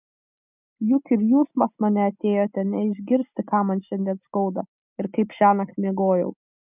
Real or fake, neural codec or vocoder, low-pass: real; none; 3.6 kHz